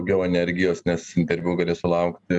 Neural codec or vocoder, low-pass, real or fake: none; 10.8 kHz; real